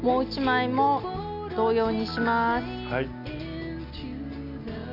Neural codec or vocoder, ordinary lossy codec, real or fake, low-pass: none; AAC, 48 kbps; real; 5.4 kHz